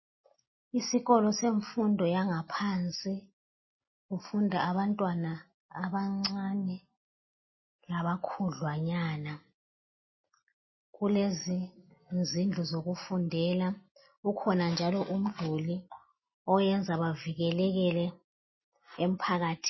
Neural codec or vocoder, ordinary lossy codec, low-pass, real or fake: none; MP3, 24 kbps; 7.2 kHz; real